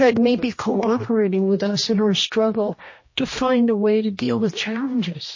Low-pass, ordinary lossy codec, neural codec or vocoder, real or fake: 7.2 kHz; MP3, 32 kbps; codec, 16 kHz, 1 kbps, X-Codec, HuBERT features, trained on general audio; fake